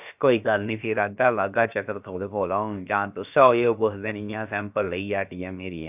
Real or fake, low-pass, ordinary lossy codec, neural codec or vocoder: fake; 3.6 kHz; none; codec, 16 kHz, about 1 kbps, DyCAST, with the encoder's durations